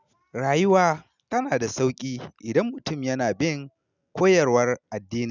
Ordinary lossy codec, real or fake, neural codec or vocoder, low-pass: none; real; none; 7.2 kHz